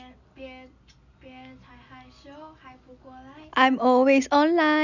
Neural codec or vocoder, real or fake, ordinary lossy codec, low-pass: none; real; none; 7.2 kHz